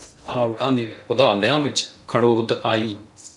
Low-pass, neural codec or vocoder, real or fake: 10.8 kHz; codec, 16 kHz in and 24 kHz out, 0.6 kbps, FocalCodec, streaming, 2048 codes; fake